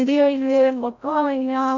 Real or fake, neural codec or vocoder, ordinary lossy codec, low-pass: fake; codec, 16 kHz, 0.5 kbps, FreqCodec, larger model; Opus, 64 kbps; 7.2 kHz